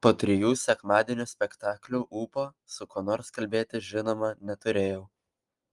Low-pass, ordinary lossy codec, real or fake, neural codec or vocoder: 10.8 kHz; Opus, 32 kbps; real; none